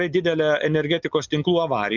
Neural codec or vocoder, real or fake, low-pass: none; real; 7.2 kHz